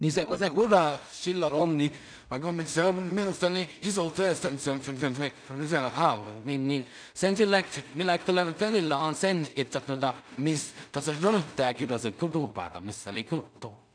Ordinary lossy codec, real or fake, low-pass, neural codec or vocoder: none; fake; 9.9 kHz; codec, 16 kHz in and 24 kHz out, 0.4 kbps, LongCat-Audio-Codec, two codebook decoder